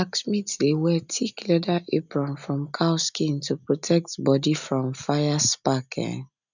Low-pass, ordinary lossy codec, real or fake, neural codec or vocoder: 7.2 kHz; none; real; none